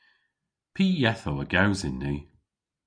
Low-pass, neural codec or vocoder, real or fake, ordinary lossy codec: 9.9 kHz; none; real; MP3, 96 kbps